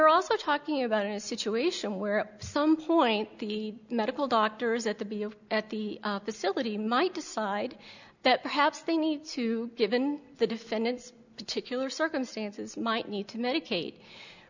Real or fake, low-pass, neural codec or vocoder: real; 7.2 kHz; none